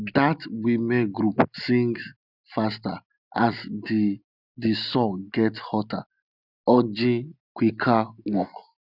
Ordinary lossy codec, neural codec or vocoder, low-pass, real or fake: none; none; 5.4 kHz; real